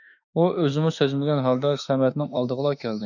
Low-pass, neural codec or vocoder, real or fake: 7.2 kHz; autoencoder, 48 kHz, 32 numbers a frame, DAC-VAE, trained on Japanese speech; fake